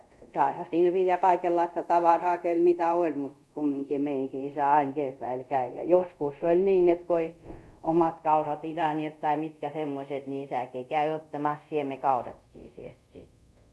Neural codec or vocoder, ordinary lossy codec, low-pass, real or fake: codec, 24 kHz, 0.5 kbps, DualCodec; none; none; fake